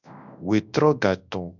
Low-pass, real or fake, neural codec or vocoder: 7.2 kHz; fake; codec, 24 kHz, 0.9 kbps, WavTokenizer, large speech release